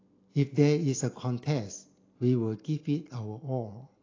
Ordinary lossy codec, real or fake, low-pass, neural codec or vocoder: AAC, 32 kbps; real; 7.2 kHz; none